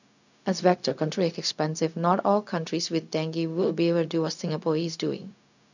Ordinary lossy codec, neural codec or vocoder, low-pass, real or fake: none; codec, 16 kHz, 0.4 kbps, LongCat-Audio-Codec; 7.2 kHz; fake